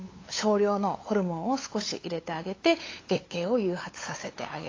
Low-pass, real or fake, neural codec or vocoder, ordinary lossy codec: 7.2 kHz; fake; codec, 16 kHz, 8 kbps, FunCodec, trained on LibriTTS, 25 frames a second; AAC, 32 kbps